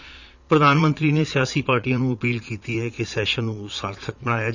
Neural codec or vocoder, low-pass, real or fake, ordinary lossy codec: vocoder, 22.05 kHz, 80 mel bands, Vocos; 7.2 kHz; fake; none